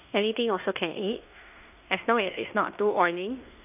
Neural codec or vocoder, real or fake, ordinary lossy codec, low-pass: codec, 16 kHz in and 24 kHz out, 0.9 kbps, LongCat-Audio-Codec, fine tuned four codebook decoder; fake; none; 3.6 kHz